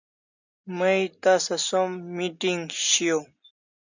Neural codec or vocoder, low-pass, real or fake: none; 7.2 kHz; real